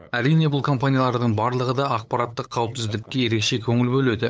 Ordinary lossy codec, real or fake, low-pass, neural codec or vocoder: none; fake; none; codec, 16 kHz, 8 kbps, FunCodec, trained on LibriTTS, 25 frames a second